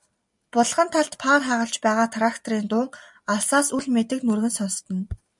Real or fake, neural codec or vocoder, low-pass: real; none; 10.8 kHz